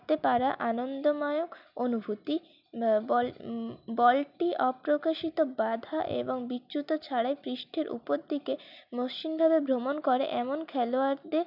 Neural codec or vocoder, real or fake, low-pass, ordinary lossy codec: none; real; 5.4 kHz; none